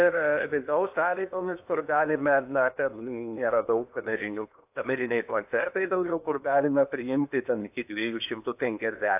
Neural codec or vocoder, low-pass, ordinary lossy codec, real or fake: codec, 16 kHz in and 24 kHz out, 0.8 kbps, FocalCodec, streaming, 65536 codes; 3.6 kHz; AAC, 32 kbps; fake